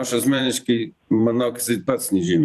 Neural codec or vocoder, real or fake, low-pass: vocoder, 44.1 kHz, 128 mel bands every 512 samples, BigVGAN v2; fake; 14.4 kHz